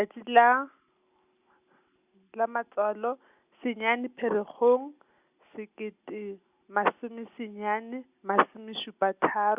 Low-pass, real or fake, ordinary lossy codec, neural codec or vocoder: 3.6 kHz; real; Opus, 64 kbps; none